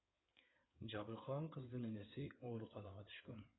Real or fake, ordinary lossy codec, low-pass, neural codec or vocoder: fake; AAC, 16 kbps; 7.2 kHz; codec, 16 kHz in and 24 kHz out, 2.2 kbps, FireRedTTS-2 codec